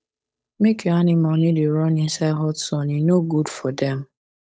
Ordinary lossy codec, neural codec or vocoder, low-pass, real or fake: none; codec, 16 kHz, 8 kbps, FunCodec, trained on Chinese and English, 25 frames a second; none; fake